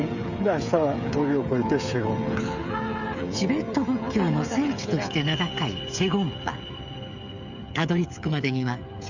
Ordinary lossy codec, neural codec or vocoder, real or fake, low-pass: none; codec, 16 kHz, 16 kbps, FreqCodec, smaller model; fake; 7.2 kHz